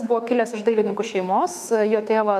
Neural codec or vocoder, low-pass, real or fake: autoencoder, 48 kHz, 32 numbers a frame, DAC-VAE, trained on Japanese speech; 14.4 kHz; fake